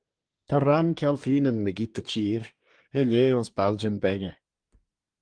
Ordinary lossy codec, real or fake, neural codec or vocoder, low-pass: Opus, 24 kbps; fake; codec, 24 kHz, 1 kbps, SNAC; 9.9 kHz